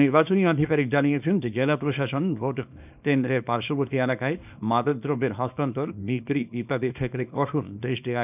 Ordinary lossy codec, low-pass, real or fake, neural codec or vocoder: none; 3.6 kHz; fake; codec, 24 kHz, 0.9 kbps, WavTokenizer, small release